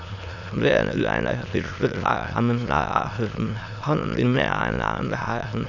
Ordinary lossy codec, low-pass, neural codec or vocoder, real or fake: none; 7.2 kHz; autoencoder, 22.05 kHz, a latent of 192 numbers a frame, VITS, trained on many speakers; fake